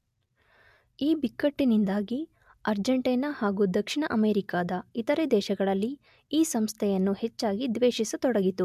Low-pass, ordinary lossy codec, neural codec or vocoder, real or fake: 14.4 kHz; AAC, 96 kbps; none; real